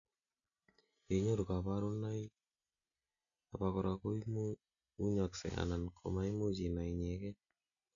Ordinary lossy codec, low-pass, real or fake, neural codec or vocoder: none; 7.2 kHz; real; none